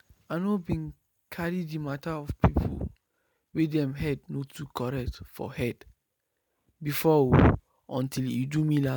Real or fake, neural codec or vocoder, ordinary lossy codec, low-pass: real; none; none; none